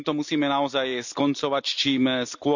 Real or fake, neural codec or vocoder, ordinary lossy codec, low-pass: real; none; none; 7.2 kHz